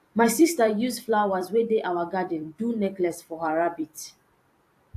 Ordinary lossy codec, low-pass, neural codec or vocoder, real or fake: AAC, 64 kbps; 14.4 kHz; vocoder, 44.1 kHz, 128 mel bands every 256 samples, BigVGAN v2; fake